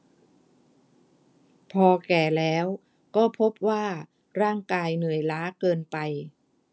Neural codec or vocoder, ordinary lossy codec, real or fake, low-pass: none; none; real; none